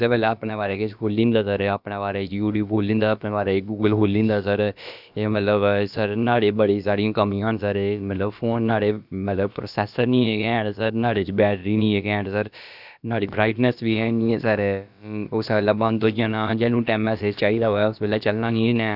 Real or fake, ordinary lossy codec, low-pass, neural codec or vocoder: fake; none; 5.4 kHz; codec, 16 kHz, about 1 kbps, DyCAST, with the encoder's durations